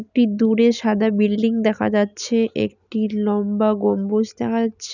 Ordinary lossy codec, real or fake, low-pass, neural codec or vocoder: none; real; 7.2 kHz; none